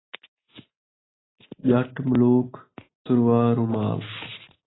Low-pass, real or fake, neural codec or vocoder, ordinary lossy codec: 7.2 kHz; real; none; AAC, 16 kbps